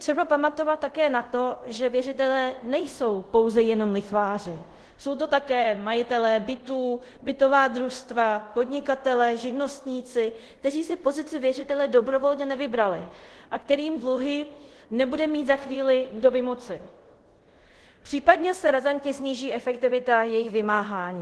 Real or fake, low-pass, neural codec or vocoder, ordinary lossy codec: fake; 10.8 kHz; codec, 24 kHz, 0.5 kbps, DualCodec; Opus, 16 kbps